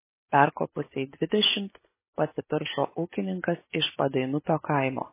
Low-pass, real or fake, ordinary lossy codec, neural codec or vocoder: 3.6 kHz; real; MP3, 16 kbps; none